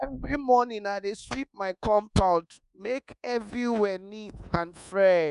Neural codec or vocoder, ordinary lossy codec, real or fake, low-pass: codec, 24 kHz, 1.2 kbps, DualCodec; none; fake; 10.8 kHz